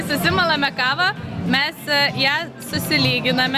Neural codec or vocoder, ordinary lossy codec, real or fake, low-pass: none; Opus, 64 kbps; real; 14.4 kHz